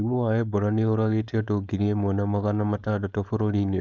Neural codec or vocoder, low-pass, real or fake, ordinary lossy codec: codec, 16 kHz, 4.8 kbps, FACodec; none; fake; none